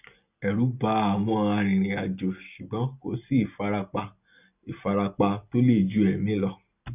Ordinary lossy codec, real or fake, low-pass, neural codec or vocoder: none; real; 3.6 kHz; none